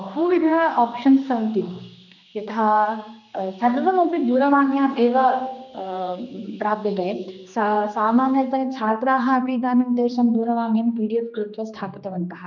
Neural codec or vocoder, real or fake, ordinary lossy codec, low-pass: codec, 16 kHz, 2 kbps, X-Codec, HuBERT features, trained on general audio; fake; none; 7.2 kHz